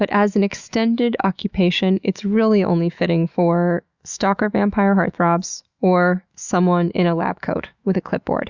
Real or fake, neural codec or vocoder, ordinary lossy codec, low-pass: fake; autoencoder, 48 kHz, 128 numbers a frame, DAC-VAE, trained on Japanese speech; Opus, 64 kbps; 7.2 kHz